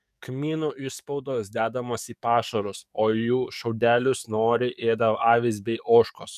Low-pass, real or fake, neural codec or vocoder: 14.4 kHz; fake; codec, 44.1 kHz, 7.8 kbps, DAC